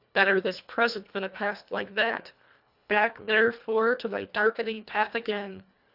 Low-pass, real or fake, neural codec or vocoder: 5.4 kHz; fake; codec, 24 kHz, 1.5 kbps, HILCodec